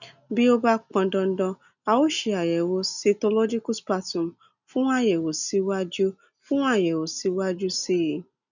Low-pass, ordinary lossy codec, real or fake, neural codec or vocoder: 7.2 kHz; none; real; none